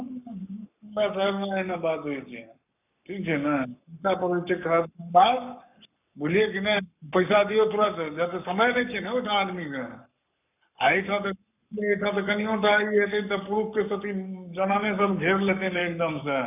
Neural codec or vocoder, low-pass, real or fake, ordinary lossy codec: codec, 44.1 kHz, 7.8 kbps, Pupu-Codec; 3.6 kHz; fake; none